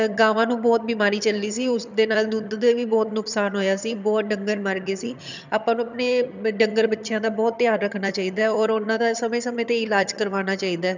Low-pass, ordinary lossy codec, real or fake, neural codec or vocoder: 7.2 kHz; none; fake; vocoder, 22.05 kHz, 80 mel bands, HiFi-GAN